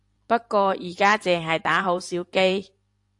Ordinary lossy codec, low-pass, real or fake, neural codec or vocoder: AAC, 64 kbps; 10.8 kHz; fake; vocoder, 44.1 kHz, 128 mel bands every 512 samples, BigVGAN v2